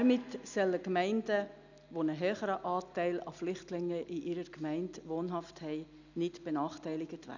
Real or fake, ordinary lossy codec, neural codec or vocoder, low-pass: real; none; none; 7.2 kHz